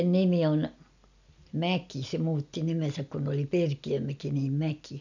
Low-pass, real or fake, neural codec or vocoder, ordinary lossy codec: 7.2 kHz; real; none; none